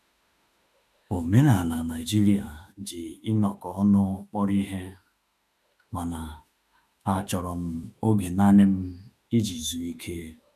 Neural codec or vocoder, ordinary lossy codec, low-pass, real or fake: autoencoder, 48 kHz, 32 numbers a frame, DAC-VAE, trained on Japanese speech; none; 14.4 kHz; fake